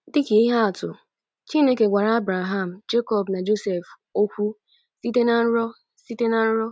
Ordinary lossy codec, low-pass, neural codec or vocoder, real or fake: none; none; none; real